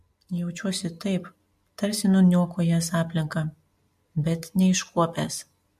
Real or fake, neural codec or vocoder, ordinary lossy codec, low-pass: real; none; MP3, 64 kbps; 14.4 kHz